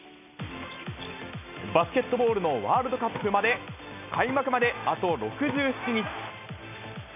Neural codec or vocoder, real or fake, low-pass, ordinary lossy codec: none; real; 3.6 kHz; none